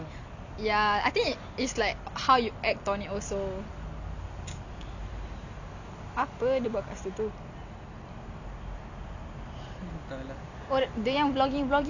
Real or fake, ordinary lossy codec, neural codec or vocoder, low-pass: real; none; none; 7.2 kHz